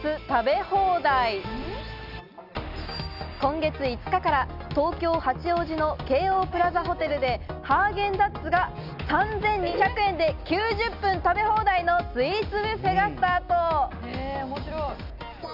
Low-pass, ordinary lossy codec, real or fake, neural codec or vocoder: 5.4 kHz; none; real; none